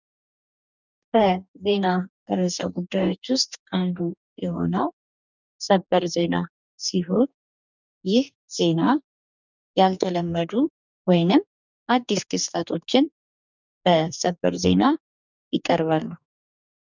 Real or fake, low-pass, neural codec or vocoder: fake; 7.2 kHz; codec, 44.1 kHz, 2.6 kbps, DAC